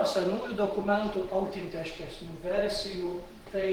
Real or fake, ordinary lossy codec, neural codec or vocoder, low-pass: fake; Opus, 16 kbps; vocoder, 44.1 kHz, 128 mel bands every 512 samples, BigVGAN v2; 19.8 kHz